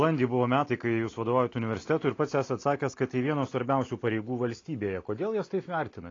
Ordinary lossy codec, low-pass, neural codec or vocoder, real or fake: AAC, 32 kbps; 7.2 kHz; none; real